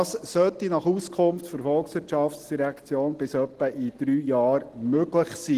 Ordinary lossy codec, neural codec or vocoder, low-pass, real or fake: Opus, 16 kbps; none; 14.4 kHz; real